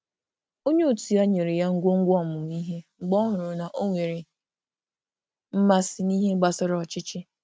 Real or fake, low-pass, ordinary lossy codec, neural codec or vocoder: real; none; none; none